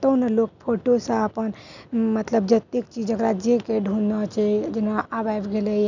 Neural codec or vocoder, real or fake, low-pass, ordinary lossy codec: none; real; 7.2 kHz; none